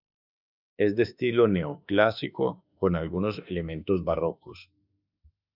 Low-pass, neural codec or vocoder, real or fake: 5.4 kHz; autoencoder, 48 kHz, 32 numbers a frame, DAC-VAE, trained on Japanese speech; fake